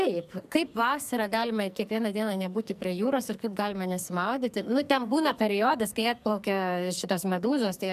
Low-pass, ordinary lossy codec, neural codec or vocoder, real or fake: 14.4 kHz; MP3, 96 kbps; codec, 44.1 kHz, 2.6 kbps, SNAC; fake